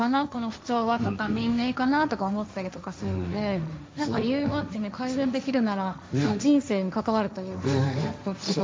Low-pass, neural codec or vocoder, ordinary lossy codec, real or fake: none; codec, 16 kHz, 1.1 kbps, Voila-Tokenizer; none; fake